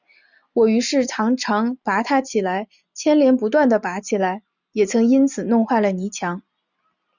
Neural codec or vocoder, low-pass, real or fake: none; 7.2 kHz; real